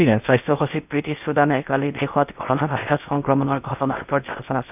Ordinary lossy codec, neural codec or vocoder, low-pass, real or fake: none; codec, 16 kHz in and 24 kHz out, 0.8 kbps, FocalCodec, streaming, 65536 codes; 3.6 kHz; fake